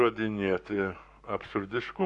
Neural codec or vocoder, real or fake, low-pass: codec, 44.1 kHz, 7.8 kbps, Pupu-Codec; fake; 10.8 kHz